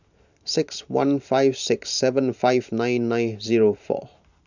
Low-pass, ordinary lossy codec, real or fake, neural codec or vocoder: 7.2 kHz; none; fake; vocoder, 44.1 kHz, 128 mel bands every 512 samples, BigVGAN v2